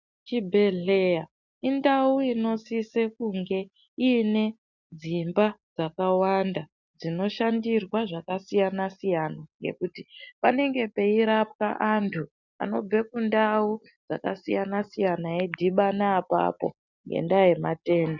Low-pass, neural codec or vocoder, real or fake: 7.2 kHz; none; real